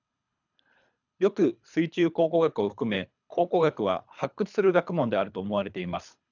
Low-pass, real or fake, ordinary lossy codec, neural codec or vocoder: 7.2 kHz; fake; none; codec, 24 kHz, 3 kbps, HILCodec